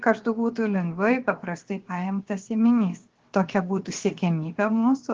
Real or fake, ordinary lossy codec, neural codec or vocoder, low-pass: fake; Opus, 16 kbps; codec, 16 kHz, 0.7 kbps, FocalCodec; 7.2 kHz